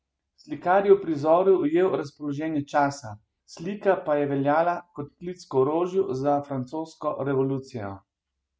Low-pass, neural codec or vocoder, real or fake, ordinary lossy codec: none; none; real; none